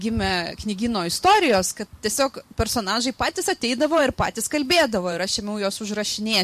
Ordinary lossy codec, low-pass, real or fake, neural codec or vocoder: MP3, 64 kbps; 14.4 kHz; fake; vocoder, 44.1 kHz, 128 mel bands every 512 samples, BigVGAN v2